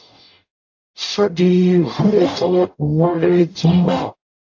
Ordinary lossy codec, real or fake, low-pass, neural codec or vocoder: AAC, 48 kbps; fake; 7.2 kHz; codec, 44.1 kHz, 0.9 kbps, DAC